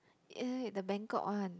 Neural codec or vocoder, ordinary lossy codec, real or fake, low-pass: none; none; real; none